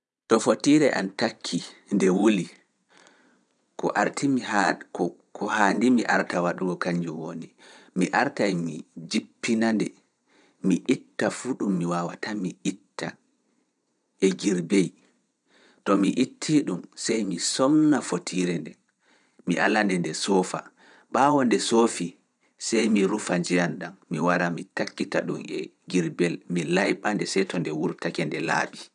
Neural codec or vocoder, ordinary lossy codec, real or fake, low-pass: vocoder, 22.05 kHz, 80 mel bands, Vocos; none; fake; 9.9 kHz